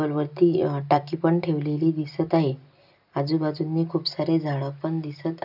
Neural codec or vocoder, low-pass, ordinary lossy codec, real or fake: none; 5.4 kHz; none; real